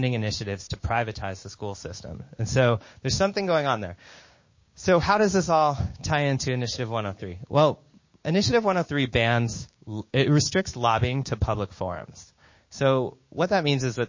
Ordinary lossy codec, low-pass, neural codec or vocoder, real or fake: MP3, 32 kbps; 7.2 kHz; codec, 16 kHz, 6 kbps, DAC; fake